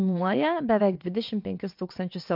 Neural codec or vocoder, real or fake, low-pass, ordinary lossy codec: vocoder, 22.05 kHz, 80 mel bands, WaveNeXt; fake; 5.4 kHz; MP3, 48 kbps